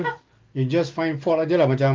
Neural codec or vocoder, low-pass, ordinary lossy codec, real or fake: none; 7.2 kHz; Opus, 32 kbps; real